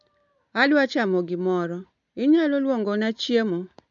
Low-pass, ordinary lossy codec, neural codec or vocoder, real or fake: 7.2 kHz; none; none; real